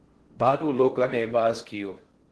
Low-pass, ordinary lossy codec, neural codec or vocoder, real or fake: 10.8 kHz; Opus, 16 kbps; codec, 16 kHz in and 24 kHz out, 0.6 kbps, FocalCodec, streaming, 4096 codes; fake